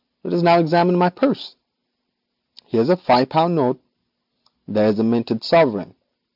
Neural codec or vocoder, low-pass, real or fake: none; 5.4 kHz; real